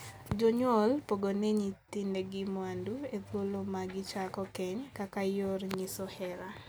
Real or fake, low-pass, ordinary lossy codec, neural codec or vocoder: real; none; none; none